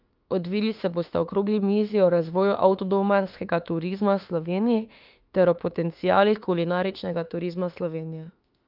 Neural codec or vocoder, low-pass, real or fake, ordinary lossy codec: autoencoder, 48 kHz, 32 numbers a frame, DAC-VAE, trained on Japanese speech; 5.4 kHz; fake; Opus, 24 kbps